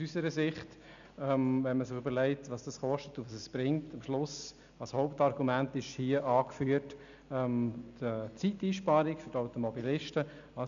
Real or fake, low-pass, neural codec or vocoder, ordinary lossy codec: real; 7.2 kHz; none; none